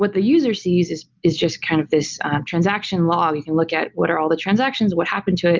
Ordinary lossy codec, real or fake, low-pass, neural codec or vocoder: Opus, 32 kbps; real; 7.2 kHz; none